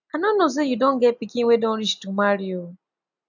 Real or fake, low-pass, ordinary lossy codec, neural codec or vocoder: real; 7.2 kHz; none; none